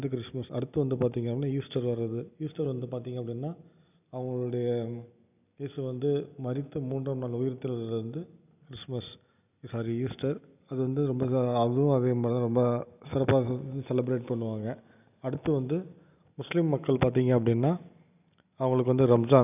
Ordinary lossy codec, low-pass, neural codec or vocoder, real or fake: none; 3.6 kHz; none; real